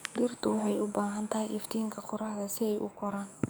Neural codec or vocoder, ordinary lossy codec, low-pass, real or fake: codec, 44.1 kHz, 7.8 kbps, DAC; none; none; fake